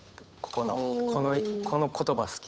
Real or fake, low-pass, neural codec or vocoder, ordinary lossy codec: fake; none; codec, 16 kHz, 8 kbps, FunCodec, trained on Chinese and English, 25 frames a second; none